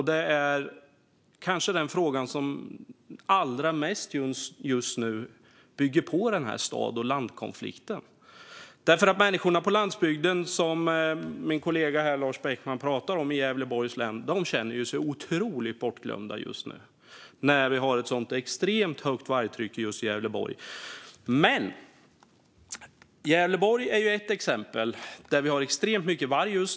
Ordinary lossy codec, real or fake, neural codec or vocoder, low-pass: none; real; none; none